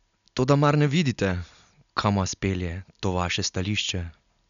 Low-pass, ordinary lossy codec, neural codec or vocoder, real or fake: 7.2 kHz; none; none; real